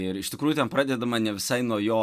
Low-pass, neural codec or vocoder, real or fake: 14.4 kHz; none; real